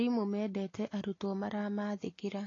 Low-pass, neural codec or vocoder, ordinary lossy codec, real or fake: 7.2 kHz; none; AAC, 32 kbps; real